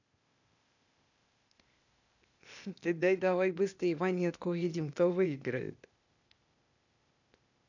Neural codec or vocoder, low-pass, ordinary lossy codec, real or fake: codec, 16 kHz, 0.8 kbps, ZipCodec; 7.2 kHz; none; fake